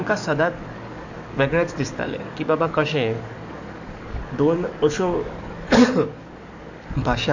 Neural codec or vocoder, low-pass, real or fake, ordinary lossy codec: codec, 44.1 kHz, 7.8 kbps, DAC; 7.2 kHz; fake; none